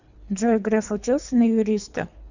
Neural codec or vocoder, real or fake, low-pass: codec, 24 kHz, 3 kbps, HILCodec; fake; 7.2 kHz